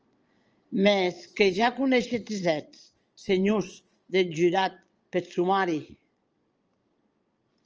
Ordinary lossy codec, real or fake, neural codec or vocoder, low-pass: Opus, 24 kbps; fake; vocoder, 22.05 kHz, 80 mel bands, Vocos; 7.2 kHz